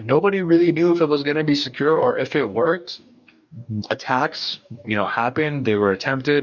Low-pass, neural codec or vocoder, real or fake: 7.2 kHz; codec, 44.1 kHz, 2.6 kbps, DAC; fake